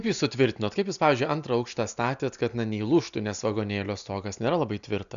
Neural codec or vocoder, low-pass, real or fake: none; 7.2 kHz; real